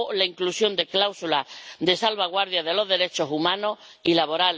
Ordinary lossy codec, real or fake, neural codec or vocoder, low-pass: none; real; none; none